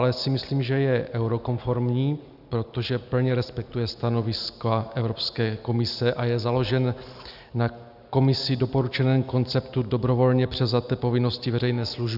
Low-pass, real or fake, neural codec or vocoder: 5.4 kHz; real; none